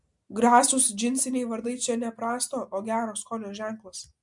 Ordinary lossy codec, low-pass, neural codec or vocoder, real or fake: MP3, 64 kbps; 10.8 kHz; none; real